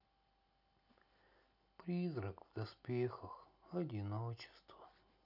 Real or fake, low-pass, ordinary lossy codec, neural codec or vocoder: real; 5.4 kHz; none; none